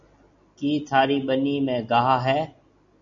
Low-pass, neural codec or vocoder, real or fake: 7.2 kHz; none; real